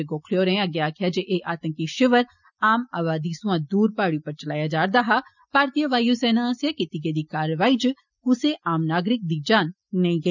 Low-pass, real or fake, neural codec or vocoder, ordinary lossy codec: 7.2 kHz; real; none; none